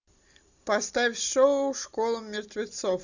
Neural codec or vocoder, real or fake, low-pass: none; real; 7.2 kHz